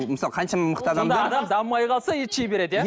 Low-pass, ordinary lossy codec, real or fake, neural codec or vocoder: none; none; real; none